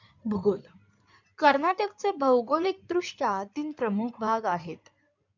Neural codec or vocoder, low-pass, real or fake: codec, 16 kHz in and 24 kHz out, 2.2 kbps, FireRedTTS-2 codec; 7.2 kHz; fake